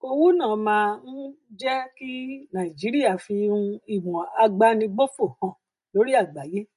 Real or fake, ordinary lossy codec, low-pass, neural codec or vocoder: real; MP3, 48 kbps; 14.4 kHz; none